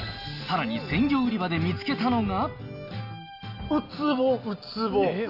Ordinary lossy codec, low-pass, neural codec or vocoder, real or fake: none; 5.4 kHz; none; real